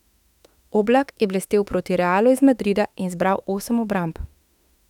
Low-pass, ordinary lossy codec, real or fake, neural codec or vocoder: 19.8 kHz; none; fake; autoencoder, 48 kHz, 32 numbers a frame, DAC-VAE, trained on Japanese speech